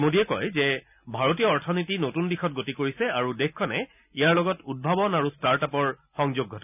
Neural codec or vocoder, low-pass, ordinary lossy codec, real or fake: none; 3.6 kHz; none; real